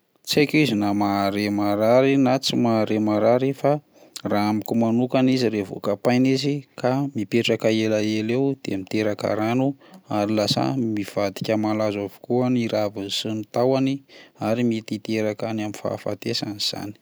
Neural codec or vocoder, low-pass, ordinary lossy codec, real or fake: none; none; none; real